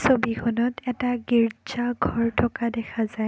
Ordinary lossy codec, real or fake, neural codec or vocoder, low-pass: none; real; none; none